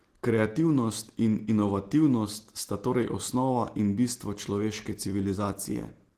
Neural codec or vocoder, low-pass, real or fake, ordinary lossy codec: none; 14.4 kHz; real; Opus, 16 kbps